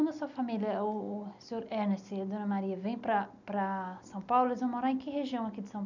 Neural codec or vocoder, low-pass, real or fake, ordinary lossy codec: none; 7.2 kHz; real; none